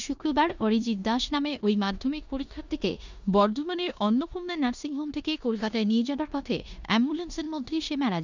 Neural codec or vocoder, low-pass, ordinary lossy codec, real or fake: codec, 16 kHz in and 24 kHz out, 0.9 kbps, LongCat-Audio-Codec, four codebook decoder; 7.2 kHz; none; fake